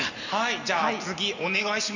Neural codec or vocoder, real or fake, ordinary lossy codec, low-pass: none; real; none; 7.2 kHz